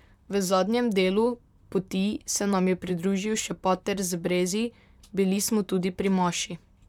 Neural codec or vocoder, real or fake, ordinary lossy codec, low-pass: vocoder, 44.1 kHz, 128 mel bands, Pupu-Vocoder; fake; none; 19.8 kHz